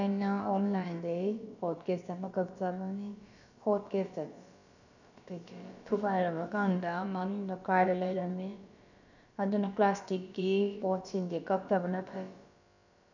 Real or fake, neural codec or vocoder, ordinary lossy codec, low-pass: fake; codec, 16 kHz, about 1 kbps, DyCAST, with the encoder's durations; none; 7.2 kHz